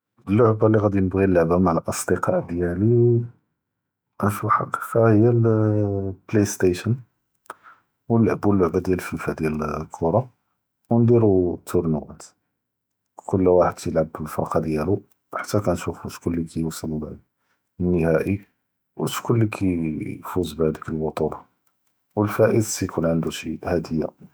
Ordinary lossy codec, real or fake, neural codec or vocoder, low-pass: none; fake; autoencoder, 48 kHz, 128 numbers a frame, DAC-VAE, trained on Japanese speech; none